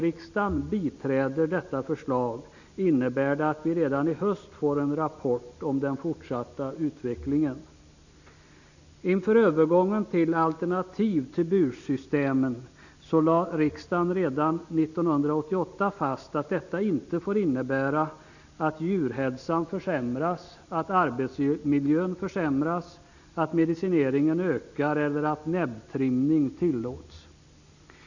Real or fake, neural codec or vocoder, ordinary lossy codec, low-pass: real; none; none; 7.2 kHz